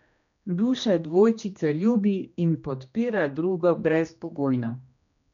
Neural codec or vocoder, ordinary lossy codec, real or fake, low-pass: codec, 16 kHz, 1 kbps, X-Codec, HuBERT features, trained on general audio; none; fake; 7.2 kHz